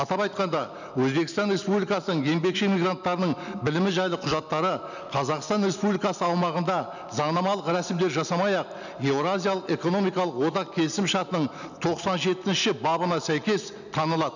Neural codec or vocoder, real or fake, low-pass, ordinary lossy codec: none; real; 7.2 kHz; none